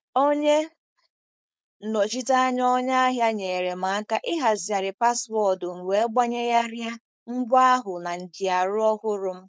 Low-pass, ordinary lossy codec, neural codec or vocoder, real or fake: none; none; codec, 16 kHz, 4.8 kbps, FACodec; fake